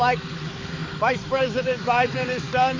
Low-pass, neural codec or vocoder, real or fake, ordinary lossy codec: 7.2 kHz; codec, 16 kHz, 4 kbps, X-Codec, HuBERT features, trained on balanced general audio; fake; MP3, 64 kbps